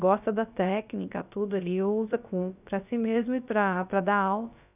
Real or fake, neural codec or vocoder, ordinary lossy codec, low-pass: fake; codec, 16 kHz, about 1 kbps, DyCAST, with the encoder's durations; none; 3.6 kHz